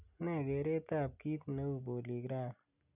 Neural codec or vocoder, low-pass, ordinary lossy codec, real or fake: none; 3.6 kHz; none; real